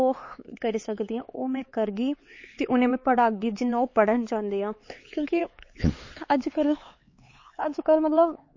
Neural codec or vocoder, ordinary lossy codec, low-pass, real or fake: codec, 16 kHz, 4 kbps, X-Codec, HuBERT features, trained on LibriSpeech; MP3, 32 kbps; 7.2 kHz; fake